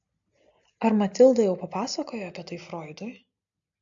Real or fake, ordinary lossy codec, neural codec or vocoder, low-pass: real; AAC, 64 kbps; none; 7.2 kHz